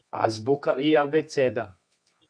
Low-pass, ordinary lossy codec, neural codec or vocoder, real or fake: 9.9 kHz; MP3, 96 kbps; codec, 24 kHz, 0.9 kbps, WavTokenizer, medium music audio release; fake